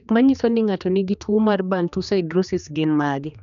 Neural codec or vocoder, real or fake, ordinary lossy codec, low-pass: codec, 16 kHz, 2 kbps, X-Codec, HuBERT features, trained on general audio; fake; none; 7.2 kHz